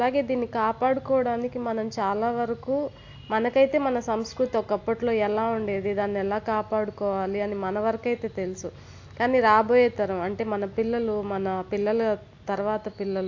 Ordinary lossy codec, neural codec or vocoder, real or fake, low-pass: AAC, 48 kbps; none; real; 7.2 kHz